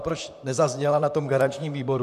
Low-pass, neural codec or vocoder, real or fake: 14.4 kHz; vocoder, 44.1 kHz, 128 mel bands, Pupu-Vocoder; fake